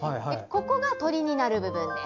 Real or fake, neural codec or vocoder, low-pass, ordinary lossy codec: real; none; 7.2 kHz; AAC, 48 kbps